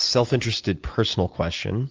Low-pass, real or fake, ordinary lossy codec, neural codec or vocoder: 7.2 kHz; real; Opus, 24 kbps; none